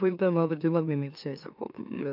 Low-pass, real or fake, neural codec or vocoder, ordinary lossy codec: 5.4 kHz; fake; autoencoder, 44.1 kHz, a latent of 192 numbers a frame, MeloTTS; none